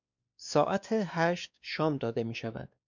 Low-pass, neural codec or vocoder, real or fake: 7.2 kHz; codec, 16 kHz, 2 kbps, X-Codec, WavLM features, trained on Multilingual LibriSpeech; fake